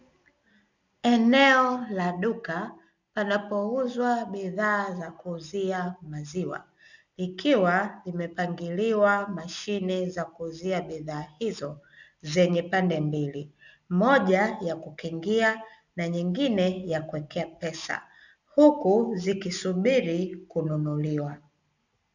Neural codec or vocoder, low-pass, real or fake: none; 7.2 kHz; real